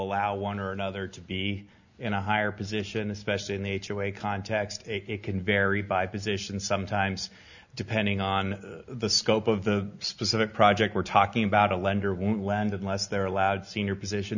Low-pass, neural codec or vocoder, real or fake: 7.2 kHz; none; real